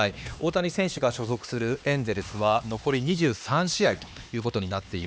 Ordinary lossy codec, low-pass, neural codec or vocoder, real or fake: none; none; codec, 16 kHz, 2 kbps, X-Codec, HuBERT features, trained on LibriSpeech; fake